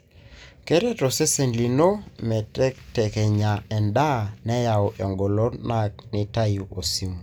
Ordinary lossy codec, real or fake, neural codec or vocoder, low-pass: none; real; none; none